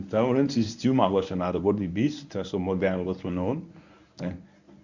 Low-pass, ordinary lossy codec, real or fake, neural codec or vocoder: 7.2 kHz; none; fake; codec, 24 kHz, 0.9 kbps, WavTokenizer, medium speech release version 1